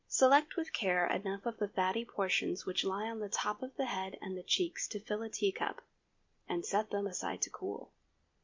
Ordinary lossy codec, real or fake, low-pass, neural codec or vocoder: MP3, 48 kbps; real; 7.2 kHz; none